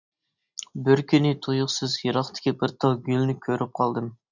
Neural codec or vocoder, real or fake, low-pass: none; real; 7.2 kHz